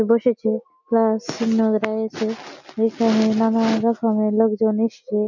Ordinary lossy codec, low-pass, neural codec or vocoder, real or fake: none; 7.2 kHz; none; real